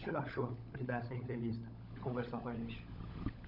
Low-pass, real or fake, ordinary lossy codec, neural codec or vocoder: 5.4 kHz; fake; none; codec, 16 kHz, 16 kbps, FunCodec, trained on LibriTTS, 50 frames a second